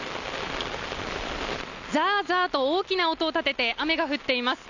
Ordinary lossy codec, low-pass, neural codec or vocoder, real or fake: none; 7.2 kHz; none; real